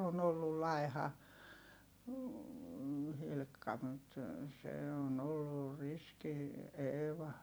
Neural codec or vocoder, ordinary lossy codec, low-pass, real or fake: vocoder, 44.1 kHz, 128 mel bands every 256 samples, BigVGAN v2; none; none; fake